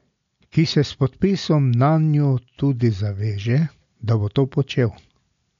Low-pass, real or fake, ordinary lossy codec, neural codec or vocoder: 7.2 kHz; real; MP3, 64 kbps; none